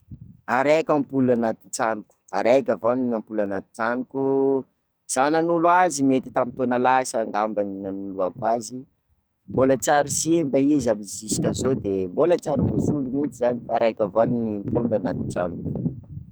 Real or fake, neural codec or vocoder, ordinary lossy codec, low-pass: fake; codec, 44.1 kHz, 2.6 kbps, SNAC; none; none